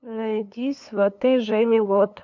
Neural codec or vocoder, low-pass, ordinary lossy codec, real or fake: codec, 16 kHz, 2 kbps, FunCodec, trained on LibriTTS, 25 frames a second; 7.2 kHz; MP3, 48 kbps; fake